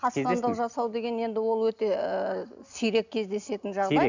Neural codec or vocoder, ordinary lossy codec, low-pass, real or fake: none; none; 7.2 kHz; real